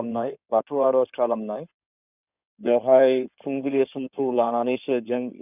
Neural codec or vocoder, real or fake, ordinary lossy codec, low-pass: codec, 16 kHz in and 24 kHz out, 2.2 kbps, FireRedTTS-2 codec; fake; none; 3.6 kHz